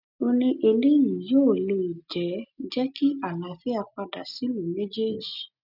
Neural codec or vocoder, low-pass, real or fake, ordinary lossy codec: none; 5.4 kHz; real; none